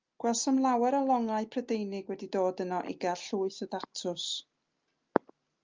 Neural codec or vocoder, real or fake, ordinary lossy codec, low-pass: none; real; Opus, 32 kbps; 7.2 kHz